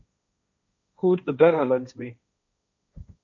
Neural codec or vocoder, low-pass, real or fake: codec, 16 kHz, 1.1 kbps, Voila-Tokenizer; 7.2 kHz; fake